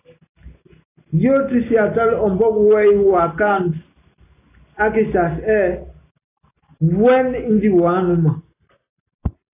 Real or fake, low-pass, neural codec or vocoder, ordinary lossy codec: real; 3.6 kHz; none; AAC, 24 kbps